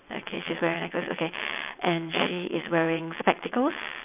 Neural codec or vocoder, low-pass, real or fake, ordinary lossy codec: vocoder, 22.05 kHz, 80 mel bands, WaveNeXt; 3.6 kHz; fake; none